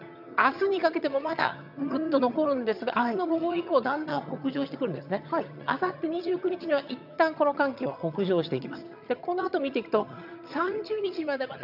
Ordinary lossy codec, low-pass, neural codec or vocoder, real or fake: none; 5.4 kHz; vocoder, 22.05 kHz, 80 mel bands, HiFi-GAN; fake